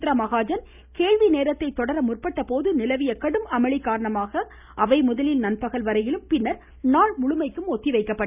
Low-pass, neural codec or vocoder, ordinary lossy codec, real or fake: 3.6 kHz; none; none; real